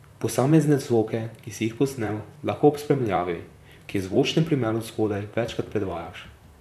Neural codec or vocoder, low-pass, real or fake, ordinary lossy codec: vocoder, 44.1 kHz, 128 mel bands, Pupu-Vocoder; 14.4 kHz; fake; none